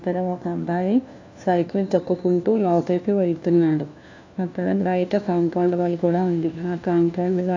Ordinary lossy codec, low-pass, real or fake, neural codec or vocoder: AAC, 48 kbps; 7.2 kHz; fake; codec, 16 kHz, 1 kbps, FunCodec, trained on LibriTTS, 50 frames a second